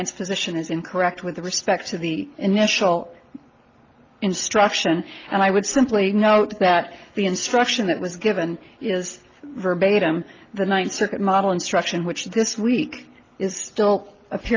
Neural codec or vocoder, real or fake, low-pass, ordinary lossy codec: none; real; 7.2 kHz; Opus, 32 kbps